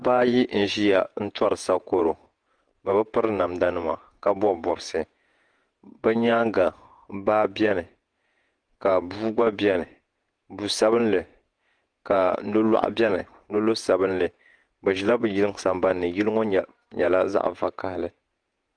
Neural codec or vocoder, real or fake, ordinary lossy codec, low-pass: vocoder, 48 kHz, 128 mel bands, Vocos; fake; Opus, 24 kbps; 9.9 kHz